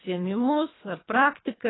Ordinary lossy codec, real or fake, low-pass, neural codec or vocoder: AAC, 16 kbps; fake; 7.2 kHz; codec, 24 kHz, 3 kbps, HILCodec